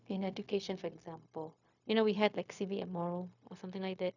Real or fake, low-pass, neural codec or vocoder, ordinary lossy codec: fake; 7.2 kHz; codec, 16 kHz, 0.4 kbps, LongCat-Audio-Codec; none